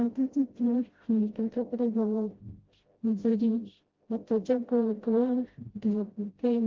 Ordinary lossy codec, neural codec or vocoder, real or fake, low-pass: Opus, 16 kbps; codec, 16 kHz, 0.5 kbps, FreqCodec, smaller model; fake; 7.2 kHz